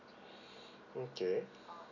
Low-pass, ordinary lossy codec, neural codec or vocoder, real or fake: 7.2 kHz; none; none; real